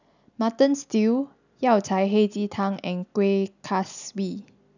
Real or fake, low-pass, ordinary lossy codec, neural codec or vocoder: real; 7.2 kHz; none; none